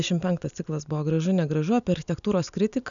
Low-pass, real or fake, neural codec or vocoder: 7.2 kHz; real; none